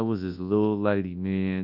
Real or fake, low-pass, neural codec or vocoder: fake; 5.4 kHz; codec, 24 kHz, 0.9 kbps, WavTokenizer, large speech release